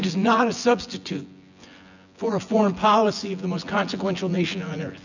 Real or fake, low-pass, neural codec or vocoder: fake; 7.2 kHz; vocoder, 24 kHz, 100 mel bands, Vocos